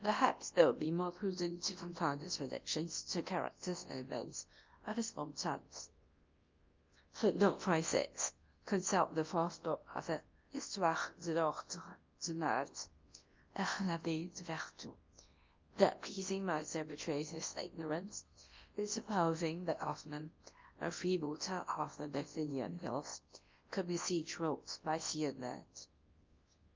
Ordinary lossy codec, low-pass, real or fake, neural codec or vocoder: Opus, 24 kbps; 7.2 kHz; fake; codec, 24 kHz, 0.9 kbps, WavTokenizer, large speech release